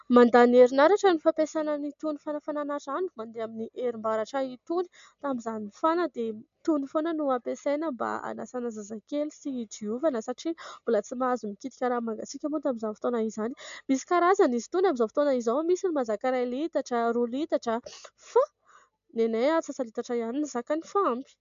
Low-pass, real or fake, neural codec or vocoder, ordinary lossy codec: 7.2 kHz; real; none; MP3, 64 kbps